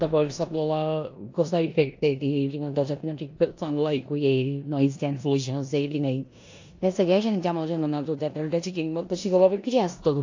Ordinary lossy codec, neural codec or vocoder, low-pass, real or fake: AAC, 48 kbps; codec, 16 kHz in and 24 kHz out, 0.9 kbps, LongCat-Audio-Codec, four codebook decoder; 7.2 kHz; fake